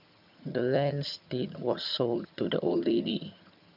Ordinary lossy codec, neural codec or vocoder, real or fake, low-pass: none; vocoder, 22.05 kHz, 80 mel bands, HiFi-GAN; fake; 5.4 kHz